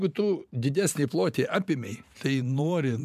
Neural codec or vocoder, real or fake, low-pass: vocoder, 44.1 kHz, 128 mel bands every 512 samples, BigVGAN v2; fake; 14.4 kHz